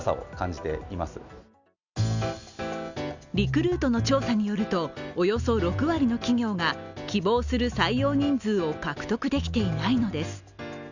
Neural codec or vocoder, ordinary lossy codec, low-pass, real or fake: none; none; 7.2 kHz; real